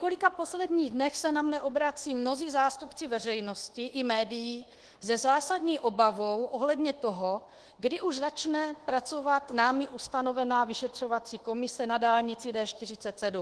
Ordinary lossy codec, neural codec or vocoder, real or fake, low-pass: Opus, 16 kbps; codec, 24 kHz, 1.2 kbps, DualCodec; fake; 10.8 kHz